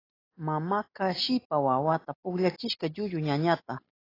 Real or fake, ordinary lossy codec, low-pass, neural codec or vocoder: real; AAC, 24 kbps; 5.4 kHz; none